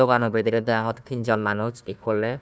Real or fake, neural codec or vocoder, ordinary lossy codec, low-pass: fake; codec, 16 kHz, 1 kbps, FunCodec, trained on Chinese and English, 50 frames a second; none; none